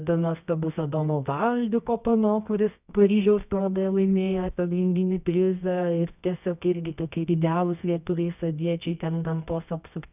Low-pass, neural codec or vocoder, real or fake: 3.6 kHz; codec, 24 kHz, 0.9 kbps, WavTokenizer, medium music audio release; fake